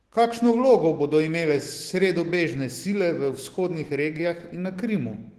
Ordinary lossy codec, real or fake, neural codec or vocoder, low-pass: Opus, 16 kbps; fake; autoencoder, 48 kHz, 128 numbers a frame, DAC-VAE, trained on Japanese speech; 14.4 kHz